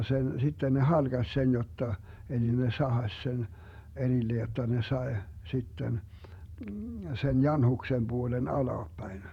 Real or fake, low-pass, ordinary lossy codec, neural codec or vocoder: fake; 19.8 kHz; none; vocoder, 48 kHz, 128 mel bands, Vocos